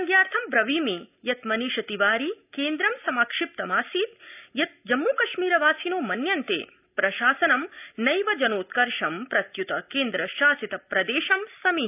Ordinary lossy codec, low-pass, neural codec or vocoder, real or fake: none; 3.6 kHz; none; real